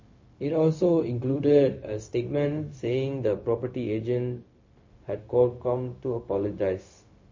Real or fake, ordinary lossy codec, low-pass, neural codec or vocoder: fake; MP3, 32 kbps; 7.2 kHz; codec, 16 kHz, 0.4 kbps, LongCat-Audio-Codec